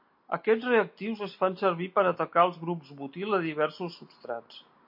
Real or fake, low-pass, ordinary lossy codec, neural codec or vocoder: real; 5.4 kHz; MP3, 32 kbps; none